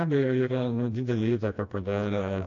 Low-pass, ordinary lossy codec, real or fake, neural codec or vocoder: 7.2 kHz; AAC, 48 kbps; fake; codec, 16 kHz, 1 kbps, FreqCodec, smaller model